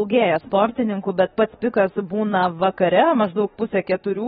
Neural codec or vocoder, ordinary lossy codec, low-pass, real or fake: autoencoder, 48 kHz, 32 numbers a frame, DAC-VAE, trained on Japanese speech; AAC, 16 kbps; 19.8 kHz; fake